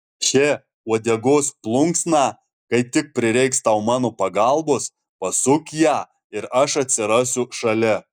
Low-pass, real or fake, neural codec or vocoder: 19.8 kHz; real; none